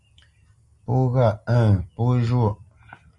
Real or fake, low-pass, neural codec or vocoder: real; 10.8 kHz; none